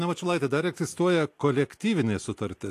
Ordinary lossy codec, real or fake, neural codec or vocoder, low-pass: AAC, 64 kbps; real; none; 14.4 kHz